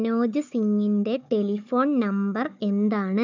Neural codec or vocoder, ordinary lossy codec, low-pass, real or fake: codec, 16 kHz, 4 kbps, FunCodec, trained on Chinese and English, 50 frames a second; none; 7.2 kHz; fake